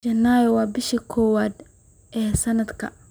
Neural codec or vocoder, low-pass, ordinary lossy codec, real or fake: none; none; none; real